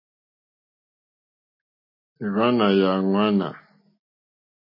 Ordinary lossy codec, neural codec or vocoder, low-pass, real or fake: MP3, 24 kbps; none; 5.4 kHz; real